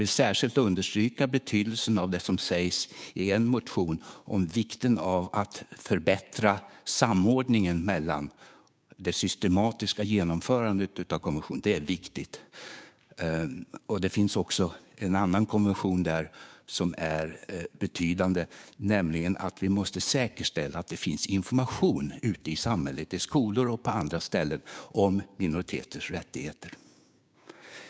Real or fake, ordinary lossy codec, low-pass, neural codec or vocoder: fake; none; none; codec, 16 kHz, 6 kbps, DAC